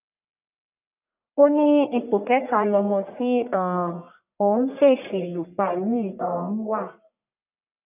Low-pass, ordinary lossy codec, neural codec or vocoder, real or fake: 3.6 kHz; none; codec, 44.1 kHz, 1.7 kbps, Pupu-Codec; fake